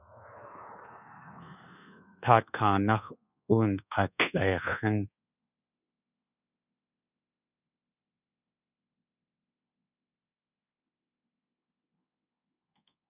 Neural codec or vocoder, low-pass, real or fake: codec, 24 kHz, 1.2 kbps, DualCodec; 3.6 kHz; fake